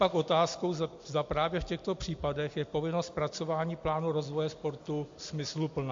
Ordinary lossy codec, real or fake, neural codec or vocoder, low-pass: MP3, 48 kbps; real; none; 7.2 kHz